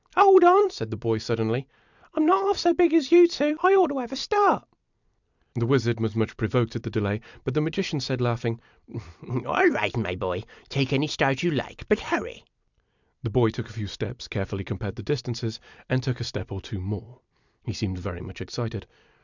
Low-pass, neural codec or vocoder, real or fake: 7.2 kHz; vocoder, 44.1 kHz, 128 mel bands every 256 samples, BigVGAN v2; fake